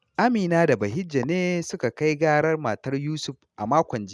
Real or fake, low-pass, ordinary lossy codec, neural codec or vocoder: real; none; none; none